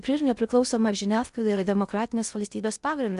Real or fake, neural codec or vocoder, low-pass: fake; codec, 16 kHz in and 24 kHz out, 0.6 kbps, FocalCodec, streaming, 2048 codes; 10.8 kHz